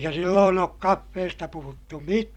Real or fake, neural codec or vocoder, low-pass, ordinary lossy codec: fake; vocoder, 44.1 kHz, 128 mel bands every 256 samples, BigVGAN v2; 19.8 kHz; Opus, 64 kbps